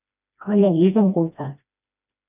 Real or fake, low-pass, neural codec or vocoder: fake; 3.6 kHz; codec, 16 kHz, 1 kbps, FreqCodec, smaller model